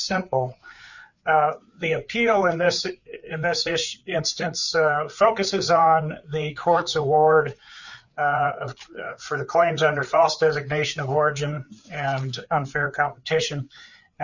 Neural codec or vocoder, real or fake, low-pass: codec, 16 kHz, 4 kbps, FreqCodec, larger model; fake; 7.2 kHz